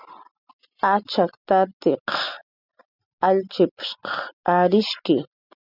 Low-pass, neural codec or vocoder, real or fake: 5.4 kHz; none; real